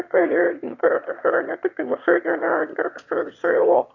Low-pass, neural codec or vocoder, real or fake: 7.2 kHz; autoencoder, 22.05 kHz, a latent of 192 numbers a frame, VITS, trained on one speaker; fake